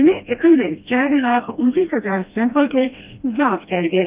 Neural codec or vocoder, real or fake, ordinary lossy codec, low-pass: codec, 16 kHz, 1 kbps, FreqCodec, smaller model; fake; Opus, 24 kbps; 3.6 kHz